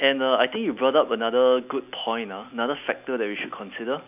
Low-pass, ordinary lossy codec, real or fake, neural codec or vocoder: 3.6 kHz; none; real; none